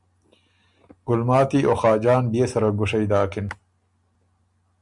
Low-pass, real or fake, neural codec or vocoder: 10.8 kHz; real; none